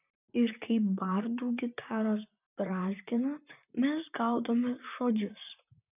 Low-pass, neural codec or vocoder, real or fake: 3.6 kHz; vocoder, 44.1 kHz, 128 mel bands, Pupu-Vocoder; fake